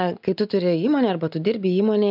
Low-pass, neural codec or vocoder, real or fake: 5.4 kHz; none; real